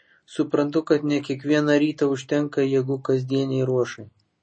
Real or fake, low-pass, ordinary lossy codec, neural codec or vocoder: real; 9.9 kHz; MP3, 32 kbps; none